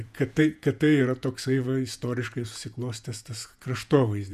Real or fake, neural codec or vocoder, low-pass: fake; vocoder, 48 kHz, 128 mel bands, Vocos; 14.4 kHz